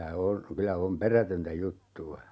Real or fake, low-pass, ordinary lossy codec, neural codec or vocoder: real; none; none; none